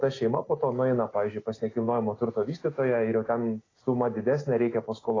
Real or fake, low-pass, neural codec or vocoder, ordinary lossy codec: real; 7.2 kHz; none; AAC, 32 kbps